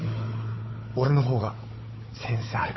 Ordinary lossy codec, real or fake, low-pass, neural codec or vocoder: MP3, 24 kbps; fake; 7.2 kHz; codec, 16 kHz, 4 kbps, FunCodec, trained on Chinese and English, 50 frames a second